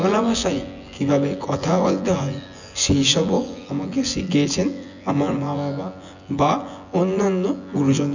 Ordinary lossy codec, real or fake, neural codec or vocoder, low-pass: none; fake; vocoder, 24 kHz, 100 mel bands, Vocos; 7.2 kHz